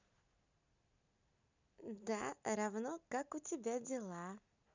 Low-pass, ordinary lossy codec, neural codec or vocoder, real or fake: 7.2 kHz; none; none; real